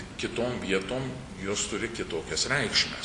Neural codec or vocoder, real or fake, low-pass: none; real; 10.8 kHz